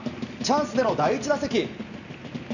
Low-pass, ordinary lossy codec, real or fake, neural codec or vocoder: 7.2 kHz; none; real; none